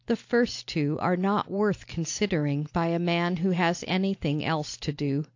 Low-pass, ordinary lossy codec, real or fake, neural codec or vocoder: 7.2 kHz; AAC, 48 kbps; real; none